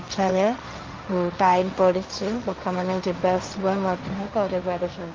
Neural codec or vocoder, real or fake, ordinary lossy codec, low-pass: codec, 16 kHz, 1.1 kbps, Voila-Tokenizer; fake; Opus, 24 kbps; 7.2 kHz